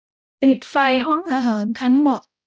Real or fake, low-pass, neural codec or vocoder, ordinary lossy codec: fake; none; codec, 16 kHz, 0.5 kbps, X-Codec, HuBERT features, trained on balanced general audio; none